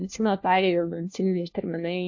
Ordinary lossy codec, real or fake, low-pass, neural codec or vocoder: AAC, 48 kbps; fake; 7.2 kHz; codec, 16 kHz, 1 kbps, FunCodec, trained on LibriTTS, 50 frames a second